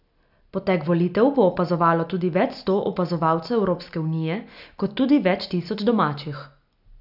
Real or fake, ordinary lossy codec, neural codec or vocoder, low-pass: real; none; none; 5.4 kHz